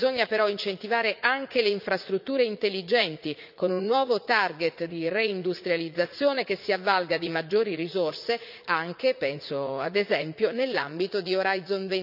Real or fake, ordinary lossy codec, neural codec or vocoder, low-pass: fake; none; vocoder, 44.1 kHz, 80 mel bands, Vocos; 5.4 kHz